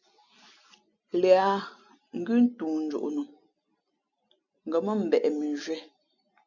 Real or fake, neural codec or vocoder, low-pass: real; none; 7.2 kHz